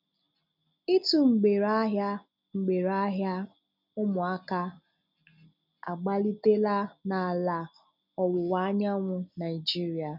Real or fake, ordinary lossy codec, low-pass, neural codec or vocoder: real; none; 5.4 kHz; none